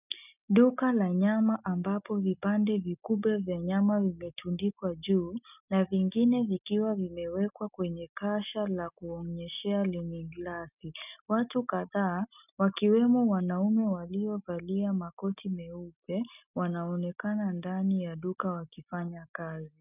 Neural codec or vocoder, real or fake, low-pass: none; real; 3.6 kHz